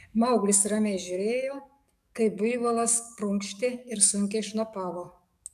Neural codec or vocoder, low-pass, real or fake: codec, 44.1 kHz, 7.8 kbps, DAC; 14.4 kHz; fake